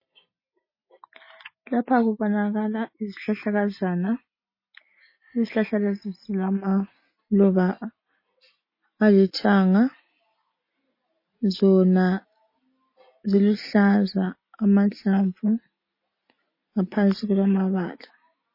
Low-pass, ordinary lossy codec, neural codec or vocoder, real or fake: 5.4 kHz; MP3, 24 kbps; none; real